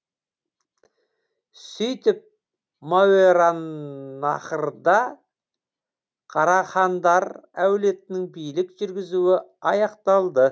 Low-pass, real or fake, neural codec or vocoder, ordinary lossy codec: none; real; none; none